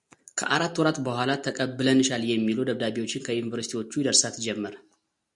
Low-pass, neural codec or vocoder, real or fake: 10.8 kHz; none; real